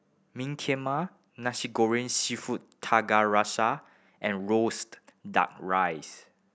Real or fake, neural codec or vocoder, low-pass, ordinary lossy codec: real; none; none; none